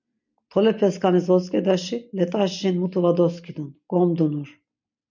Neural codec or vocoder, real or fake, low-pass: none; real; 7.2 kHz